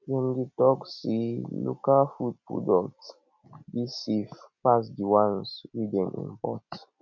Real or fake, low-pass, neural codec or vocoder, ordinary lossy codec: real; 7.2 kHz; none; none